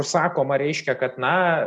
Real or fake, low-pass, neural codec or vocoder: real; 10.8 kHz; none